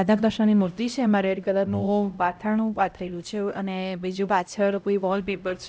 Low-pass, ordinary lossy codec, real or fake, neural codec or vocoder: none; none; fake; codec, 16 kHz, 0.5 kbps, X-Codec, HuBERT features, trained on LibriSpeech